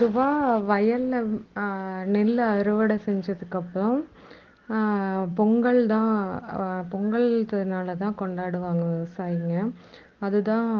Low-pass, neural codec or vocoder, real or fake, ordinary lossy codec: 7.2 kHz; none; real; Opus, 16 kbps